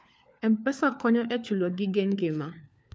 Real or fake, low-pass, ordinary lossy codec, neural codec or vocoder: fake; none; none; codec, 16 kHz, 4 kbps, FunCodec, trained on LibriTTS, 50 frames a second